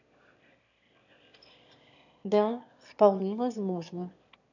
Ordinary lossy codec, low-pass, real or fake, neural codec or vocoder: none; 7.2 kHz; fake; autoencoder, 22.05 kHz, a latent of 192 numbers a frame, VITS, trained on one speaker